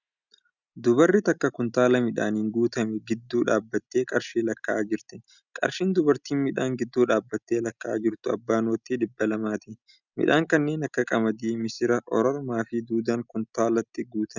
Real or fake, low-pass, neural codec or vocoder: real; 7.2 kHz; none